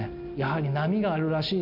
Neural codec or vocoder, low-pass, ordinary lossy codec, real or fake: none; 5.4 kHz; MP3, 48 kbps; real